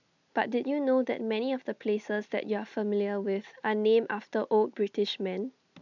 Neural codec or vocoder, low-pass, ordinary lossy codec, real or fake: none; 7.2 kHz; none; real